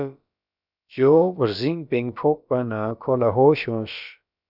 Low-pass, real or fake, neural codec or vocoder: 5.4 kHz; fake; codec, 16 kHz, about 1 kbps, DyCAST, with the encoder's durations